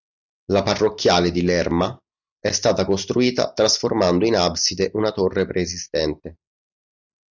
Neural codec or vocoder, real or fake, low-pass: none; real; 7.2 kHz